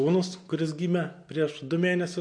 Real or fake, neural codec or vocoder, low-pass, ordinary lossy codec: real; none; 9.9 kHz; MP3, 48 kbps